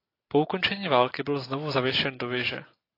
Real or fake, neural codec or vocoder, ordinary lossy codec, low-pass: real; none; AAC, 32 kbps; 5.4 kHz